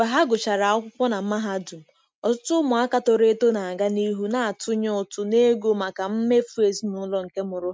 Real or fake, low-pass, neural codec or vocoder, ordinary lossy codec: real; none; none; none